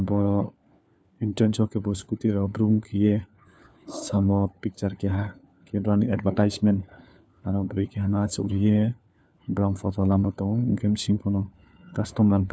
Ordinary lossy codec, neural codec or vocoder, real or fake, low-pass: none; codec, 16 kHz, 4 kbps, FunCodec, trained on LibriTTS, 50 frames a second; fake; none